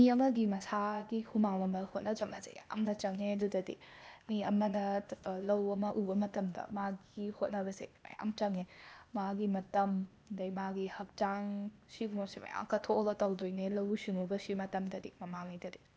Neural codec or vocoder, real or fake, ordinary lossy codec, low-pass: codec, 16 kHz, 0.8 kbps, ZipCodec; fake; none; none